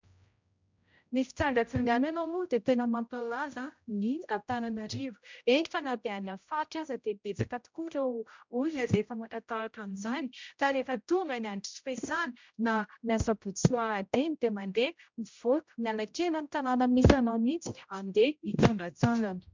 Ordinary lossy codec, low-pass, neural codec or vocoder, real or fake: MP3, 64 kbps; 7.2 kHz; codec, 16 kHz, 0.5 kbps, X-Codec, HuBERT features, trained on general audio; fake